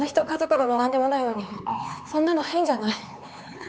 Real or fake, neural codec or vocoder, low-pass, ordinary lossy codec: fake; codec, 16 kHz, 4 kbps, X-Codec, HuBERT features, trained on LibriSpeech; none; none